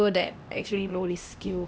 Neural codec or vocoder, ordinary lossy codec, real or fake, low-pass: codec, 16 kHz, 1 kbps, X-Codec, HuBERT features, trained on LibriSpeech; none; fake; none